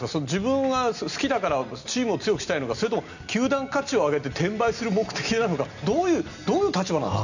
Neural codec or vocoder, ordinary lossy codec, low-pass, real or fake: none; none; 7.2 kHz; real